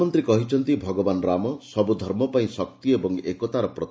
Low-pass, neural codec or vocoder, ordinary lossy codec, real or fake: none; none; none; real